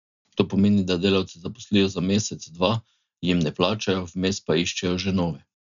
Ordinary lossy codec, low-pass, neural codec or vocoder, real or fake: none; 7.2 kHz; none; real